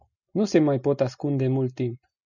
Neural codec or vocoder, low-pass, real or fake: none; 7.2 kHz; real